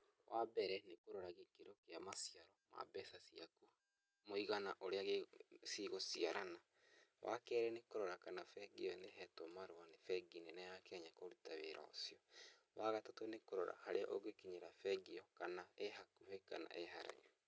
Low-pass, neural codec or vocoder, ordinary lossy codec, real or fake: none; none; none; real